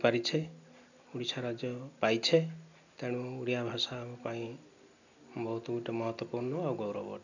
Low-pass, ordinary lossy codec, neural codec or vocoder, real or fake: 7.2 kHz; none; none; real